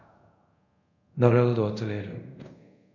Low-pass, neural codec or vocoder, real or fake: 7.2 kHz; codec, 24 kHz, 0.5 kbps, DualCodec; fake